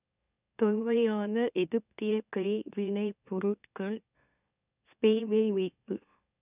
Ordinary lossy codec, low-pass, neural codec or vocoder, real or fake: none; 3.6 kHz; autoencoder, 44.1 kHz, a latent of 192 numbers a frame, MeloTTS; fake